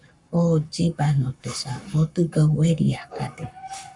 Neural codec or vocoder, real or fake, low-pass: codec, 44.1 kHz, 7.8 kbps, Pupu-Codec; fake; 10.8 kHz